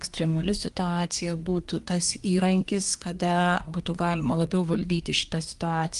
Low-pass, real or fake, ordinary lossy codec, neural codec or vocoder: 10.8 kHz; fake; Opus, 32 kbps; codec, 24 kHz, 1 kbps, SNAC